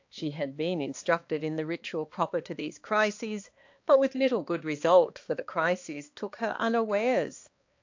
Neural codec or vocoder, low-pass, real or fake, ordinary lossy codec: codec, 16 kHz, 2 kbps, X-Codec, HuBERT features, trained on balanced general audio; 7.2 kHz; fake; AAC, 48 kbps